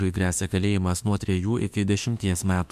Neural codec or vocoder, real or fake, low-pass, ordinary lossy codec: autoencoder, 48 kHz, 32 numbers a frame, DAC-VAE, trained on Japanese speech; fake; 14.4 kHz; MP3, 96 kbps